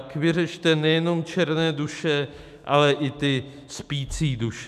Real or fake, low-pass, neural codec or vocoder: fake; 14.4 kHz; autoencoder, 48 kHz, 128 numbers a frame, DAC-VAE, trained on Japanese speech